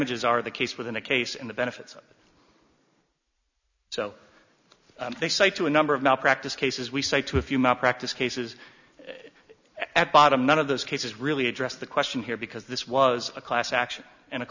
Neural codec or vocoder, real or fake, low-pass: none; real; 7.2 kHz